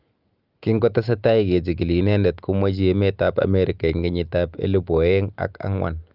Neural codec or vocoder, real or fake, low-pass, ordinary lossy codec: none; real; 5.4 kHz; Opus, 24 kbps